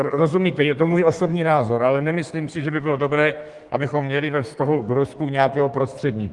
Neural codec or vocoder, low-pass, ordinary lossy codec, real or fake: codec, 32 kHz, 1.9 kbps, SNAC; 10.8 kHz; Opus, 24 kbps; fake